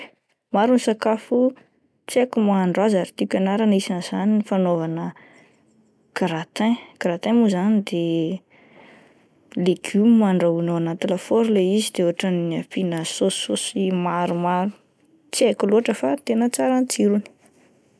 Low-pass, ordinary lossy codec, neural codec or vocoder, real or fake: none; none; none; real